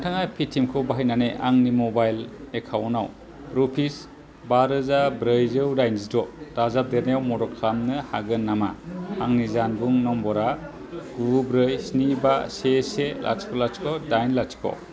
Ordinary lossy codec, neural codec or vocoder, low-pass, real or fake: none; none; none; real